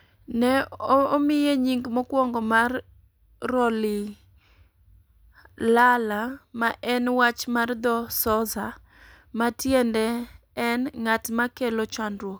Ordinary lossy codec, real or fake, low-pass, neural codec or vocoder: none; real; none; none